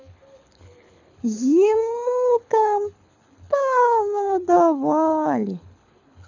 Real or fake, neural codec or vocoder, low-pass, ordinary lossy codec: fake; codec, 24 kHz, 6 kbps, HILCodec; 7.2 kHz; none